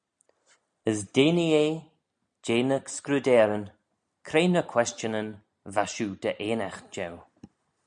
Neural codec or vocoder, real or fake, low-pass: none; real; 9.9 kHz